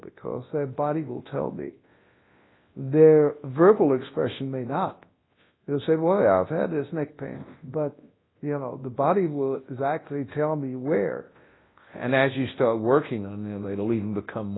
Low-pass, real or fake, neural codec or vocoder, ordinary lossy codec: 7.2 kHz; fake; codec, 24 kHz, 0.9 kbps, WavTokenizer, large speech release; AAC, 16 kbps